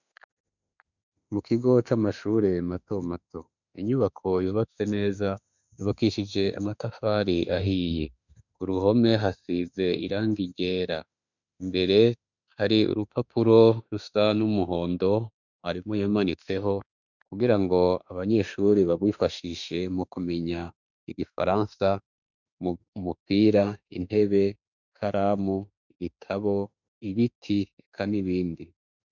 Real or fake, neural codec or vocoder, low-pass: fake; autoencoder, 48 kHz, 32 numbers a frame, DAC-VAE, trained on Japanese speech; 7.2 kHz